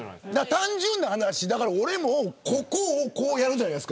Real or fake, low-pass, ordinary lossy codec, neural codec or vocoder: real; none; none; none